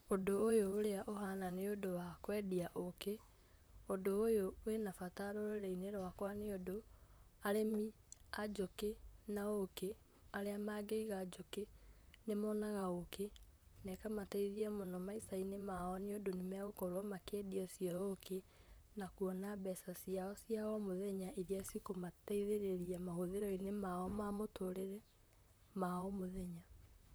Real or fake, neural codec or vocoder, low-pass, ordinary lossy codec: fake; vocoder, 44.1 kHz, 128 mel bands, Pupu-Vocoder; none; none